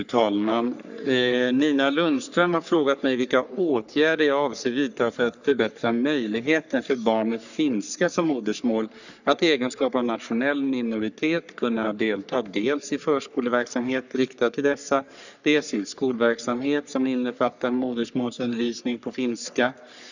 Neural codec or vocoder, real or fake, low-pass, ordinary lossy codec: codec, 44.1 kHz, 3.4 kbps, Pupu-Codec; fake; 7.2 kHz; none